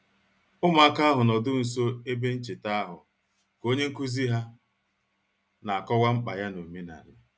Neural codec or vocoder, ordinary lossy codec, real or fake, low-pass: none; none; real; none